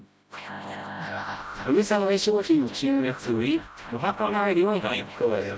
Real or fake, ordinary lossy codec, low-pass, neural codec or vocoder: fake; none; none; codec, 16 kHz, 0.5 kbps, FreqCodec, smaller model